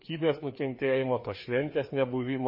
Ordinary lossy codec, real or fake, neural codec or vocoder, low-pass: MP3, 24 kbps; fake; codec, 16 kHz, 2 kbps, FreqCodec, larger model; 5.4 kHz